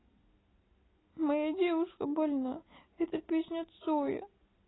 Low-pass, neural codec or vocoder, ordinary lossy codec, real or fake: 7.2 kHz; none; AAC, 16 kbps; real